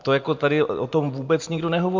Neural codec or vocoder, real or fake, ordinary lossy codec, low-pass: none; real; MP3, 64 kbps; 7.2 kHz